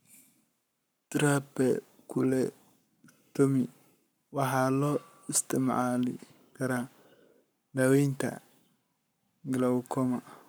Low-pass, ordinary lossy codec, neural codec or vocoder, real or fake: none; none; codec, 44.1 kHz, 7.8 kbps, Pupu-Codec; fake